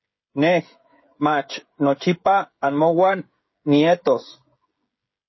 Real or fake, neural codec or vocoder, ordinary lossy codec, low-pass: fake; codec, 16 kHz, 16 kbps, FreqCodec, smaller model; MP3, 24 kbps; 7.2 kHz